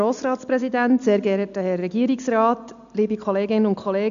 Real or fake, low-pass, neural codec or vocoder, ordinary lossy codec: real; 7.2 kHz; none; none